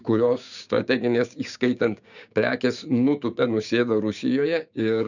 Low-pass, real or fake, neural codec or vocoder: 7.2 kHz; fake; codec, 16 kHz, 6 kbps, DAC